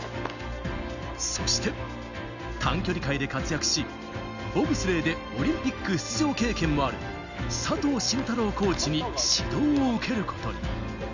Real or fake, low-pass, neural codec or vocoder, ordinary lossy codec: real; 7.2 kHz; none; none